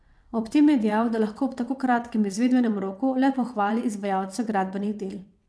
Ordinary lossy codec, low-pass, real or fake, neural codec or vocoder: none; none; fake; vocoder, 22.05 kHz, 80 mel bands, WaveNeXt